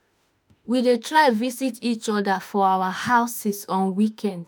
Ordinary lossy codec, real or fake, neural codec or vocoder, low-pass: none; fake; autoencoder, 48 kHz, 32 numbers a frame, DAC-VAE, trained on Japanese speech; none